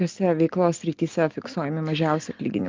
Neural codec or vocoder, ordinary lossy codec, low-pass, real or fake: none; Opus, 32 kbps; 7.2 kHz; real